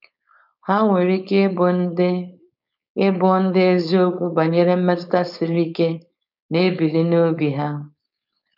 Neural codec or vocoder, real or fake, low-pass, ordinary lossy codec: codec, 16 kHz, 4.8 kbps, FACodec; fake; 5.4 kHz; none